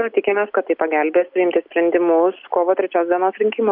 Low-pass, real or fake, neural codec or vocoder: 5.4 kHz; real; none